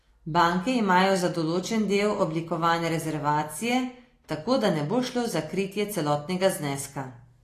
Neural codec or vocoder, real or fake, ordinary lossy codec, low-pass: none; real; AAC, 48 kbps; 14.4 kHz